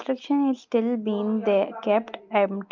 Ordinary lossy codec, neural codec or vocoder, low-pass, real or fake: Opus, 24 kbps; none; 7.2 kHz; real